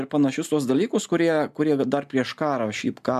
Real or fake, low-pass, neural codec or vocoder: real; 14.4 kHz; none